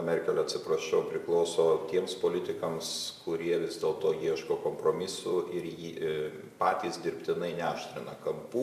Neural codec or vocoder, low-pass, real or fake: none; 14.4 kHz; real